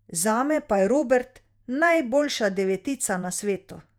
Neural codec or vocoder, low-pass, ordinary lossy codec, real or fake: vocoder, 48 kHz, 128 mel bands, Vocos; 19.8 kHz; none; fake